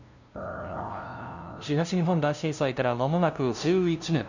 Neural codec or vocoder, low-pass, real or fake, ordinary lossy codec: codec, 16 kHz, 0.5 kbps, FunCodec, trained on LibriTTS, 25 frames a second; 7.2 kHz; fake; none